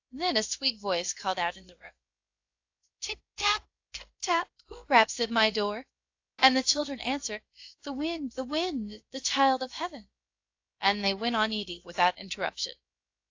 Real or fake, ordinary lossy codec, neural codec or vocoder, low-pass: fake; AAC, 48 kbps; codec, 16 kHz, about 1 kbps, DyCAST, with the encoder's durations; 7.2 kHz